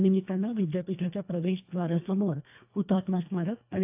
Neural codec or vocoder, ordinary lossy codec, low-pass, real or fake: codec, 24 kHz, 1.5 kbps, HILCodec; AAC, 32 kbps; 3.6 kHz; fake